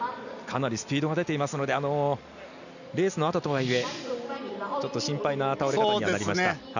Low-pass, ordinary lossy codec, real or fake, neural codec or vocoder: 7.2 kHz; none; real; none